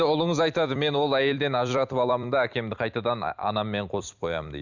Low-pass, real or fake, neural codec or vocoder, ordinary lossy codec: 7.2 kHz; fake; vocoder, 44.1 kHz, 128 mel bands every 256 samples, BigVGAN v2; none